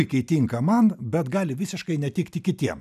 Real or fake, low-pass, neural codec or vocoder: real; 14.4 kHz; none